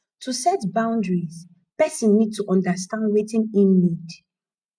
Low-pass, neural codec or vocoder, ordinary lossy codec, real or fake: 9.9 kHz; none; MP3, 96 kbps; real